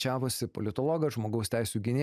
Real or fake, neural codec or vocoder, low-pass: real; none; 14.4 kHz